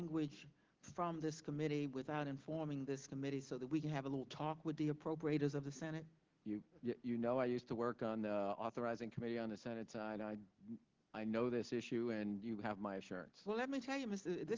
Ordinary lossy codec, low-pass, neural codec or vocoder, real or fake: Opus, 16 kbps; 7.2 kHz; none; real